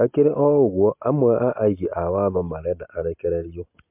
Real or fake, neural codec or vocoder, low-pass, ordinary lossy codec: real; none; 3.6 kHz; MP3, 32 kbps